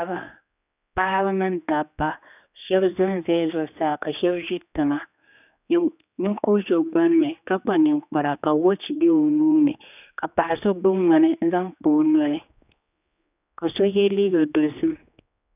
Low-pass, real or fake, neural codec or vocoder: 3.6 kHz; fake; codec, 16 kHz, 2 kbps, X-Codec, HuBERT features, trained on general audio